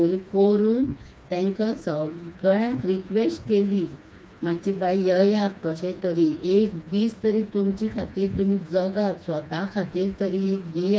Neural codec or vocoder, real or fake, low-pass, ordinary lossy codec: codec, 16 kHz, 2 kbps, FreqCodec, smaller model; fake; none; none